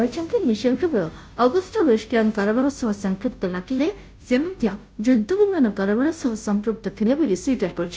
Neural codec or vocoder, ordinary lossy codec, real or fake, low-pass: codec, 16 kHz, 0.5 kbps, FunCodec, trained on Chinese and English, 25 frames a second; none; fake; none